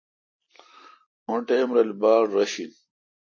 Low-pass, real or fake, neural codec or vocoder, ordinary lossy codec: 7.2 kHz; real; none; MP3, 32 kbps